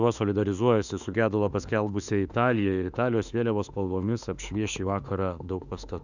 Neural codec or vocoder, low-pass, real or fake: autoencoder, 48 kHz, 32 numbers a frame, DAC-VAE, trained on Japanese speech; 7.2 kHz; fake